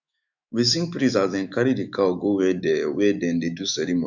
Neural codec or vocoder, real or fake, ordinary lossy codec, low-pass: autoencoder, 48 kHz, 128 numbers a frame, DAC-VAE, trained on Japanese speech; fake; none; 7.2 kHz